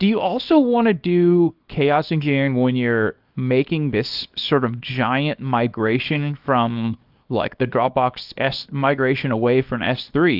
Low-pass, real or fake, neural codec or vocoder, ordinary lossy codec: 5.4 kHz; fake; codec, 24 kHz, 0.9 kbps, WavTokenizer, small release; Opus, 24 kbps